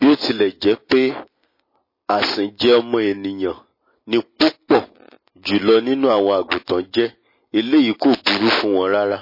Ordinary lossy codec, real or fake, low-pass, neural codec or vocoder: MP3, 24 kbps; real; 5.4 kHz; none